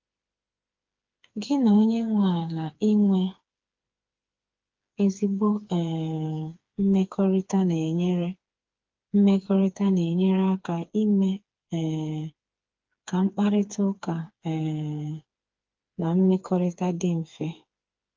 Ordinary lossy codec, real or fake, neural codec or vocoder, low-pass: Opus, 32 kbps; fake; codec, 16 kHz, 4 kbps, FreqCodec, smaller model; 7.2 kHz